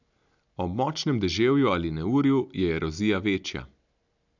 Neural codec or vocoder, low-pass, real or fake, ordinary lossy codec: none; 7.2 kHz; real; none